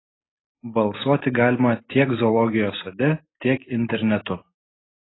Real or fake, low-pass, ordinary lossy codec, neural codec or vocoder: real; 7.2 kHz; AAC, 16 kbps; none